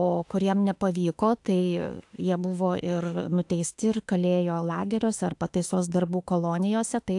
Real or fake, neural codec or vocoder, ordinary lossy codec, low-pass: fake; autoencoder, 48 kHz, 32 numbers a frame, DAC-VAE, trained on Japanese speech; AAC, 64 kbps; 10.8 kHz